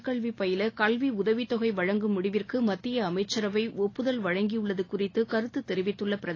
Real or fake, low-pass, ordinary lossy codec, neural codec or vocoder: real; 7.2 kHz; AAC, 32 kbps; none